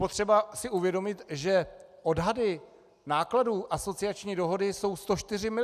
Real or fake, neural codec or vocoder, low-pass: real; none; 14.4 kHz